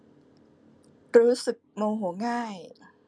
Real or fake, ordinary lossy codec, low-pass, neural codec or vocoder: real; none; none; none